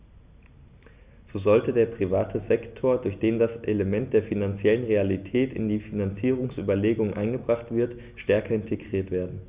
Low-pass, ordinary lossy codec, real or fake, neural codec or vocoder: 3.6 kHz; Opus, 64 kbps; real; none